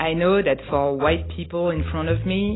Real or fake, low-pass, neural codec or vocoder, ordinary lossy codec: real; 7.2 kHz; none; AAC, 16 kbps